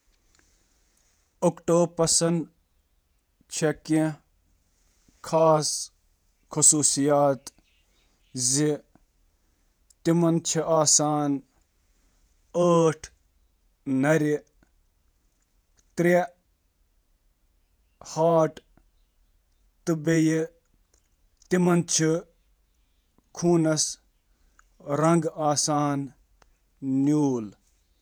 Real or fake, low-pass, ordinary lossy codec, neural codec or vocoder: fake; none; none; vocoder, 48 kHz, 128 mel bands, Vocos